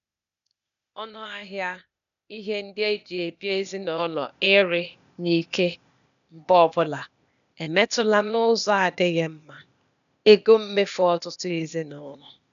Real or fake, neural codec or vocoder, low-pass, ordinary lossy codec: fake; codec, 16 kHz, 0.8 kbps, ZipCodec; 7.2 kHz; none